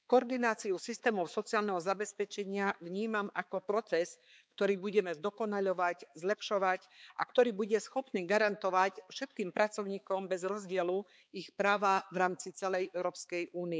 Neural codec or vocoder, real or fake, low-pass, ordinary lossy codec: codec, 16 kHz, 4 kbps, X-Codec, HuBERT features, trained on balanced general audio; fake; none; none